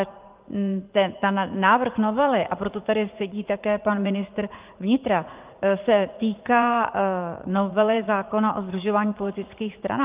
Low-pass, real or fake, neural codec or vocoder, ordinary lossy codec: 3.6 kHz; fake; vocoder, 44.1 kHz, 80 mel bands, Vocos; Opus, 24 kbps